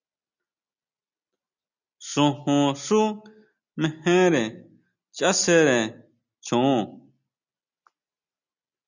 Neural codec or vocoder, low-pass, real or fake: none; 7.2 kHz; real